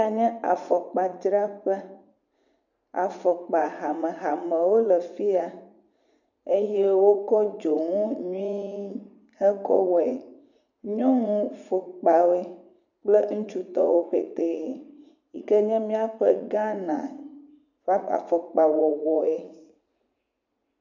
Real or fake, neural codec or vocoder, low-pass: fake; vocoder, 44.1 kHz, 80 mel bands, Vocos; 7.2 kHz